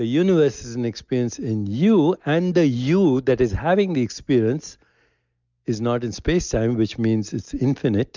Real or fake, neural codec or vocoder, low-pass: real; none; 7.2 kHz